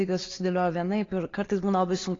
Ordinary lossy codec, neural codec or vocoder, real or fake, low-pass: AAC, 32 kbps; none; real; 7.2 kHz